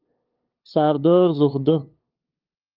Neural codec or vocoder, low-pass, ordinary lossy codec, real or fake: codec, 16 kHz, 2 kbps, FunCodec, trained on LibriTTS, 25 frames a second; 5.4 kHz; Opus, 24 kbps; fake